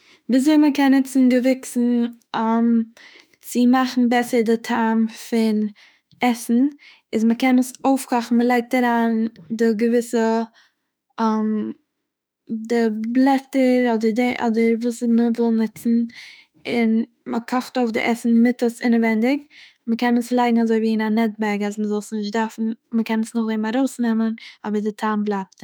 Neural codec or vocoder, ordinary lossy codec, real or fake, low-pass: autoencoder, 48 kHz, 32 numbers a frame, DAC-VAE, trained on Japanese speech; none; fake; none